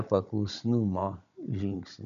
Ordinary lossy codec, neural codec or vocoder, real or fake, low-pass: MP3, 96 kbps; codec, 16 kHz, 16 kbps, FunCodec, trained on Chinese and English, 50 frames a second; fake; 7.2 kHz